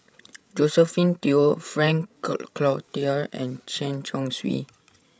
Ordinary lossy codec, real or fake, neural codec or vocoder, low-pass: none; fake; codec, 16 kHz, 16 kbps, FreqCodec, larger model; none